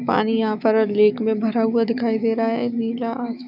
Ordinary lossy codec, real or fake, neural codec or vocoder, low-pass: none; fake; autoencoder, 48 kHz, 128 numbers a frame, DAC-VAE, trained on Japanese speech; 5.4 kHz